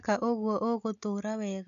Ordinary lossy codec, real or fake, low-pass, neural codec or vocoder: none; real; 7.2 kHz; none